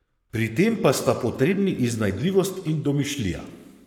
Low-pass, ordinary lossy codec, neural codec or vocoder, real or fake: 19.8 kHz; none; codec, 44.1 kHz, 7.8 kbps, Pupu-Codec; fake